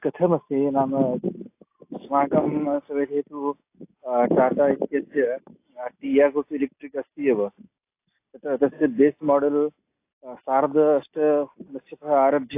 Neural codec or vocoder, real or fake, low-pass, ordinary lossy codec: none; real; 3.6 kHz; AAC, 24 kbps